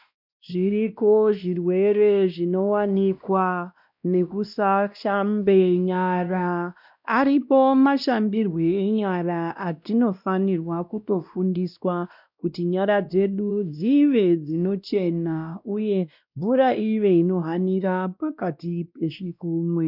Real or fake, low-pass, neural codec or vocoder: fake; 5.4 kHz; codec, 16 kHz, 1 kbps, X-Codec, WavLM features, trained on Multilingual LibriSpeech